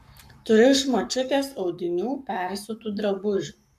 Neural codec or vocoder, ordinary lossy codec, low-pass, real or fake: codec, 44.1 kHz, 7.8 kbps, Pupu-Codec; MP3, 96 kbps; 14.4 kHz; fake